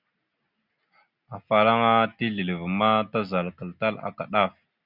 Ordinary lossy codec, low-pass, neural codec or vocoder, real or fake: Opus, 64 kbps; 5.4 kHz; none; real